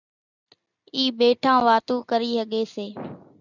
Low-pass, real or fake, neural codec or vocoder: 7.2 kHz; real; none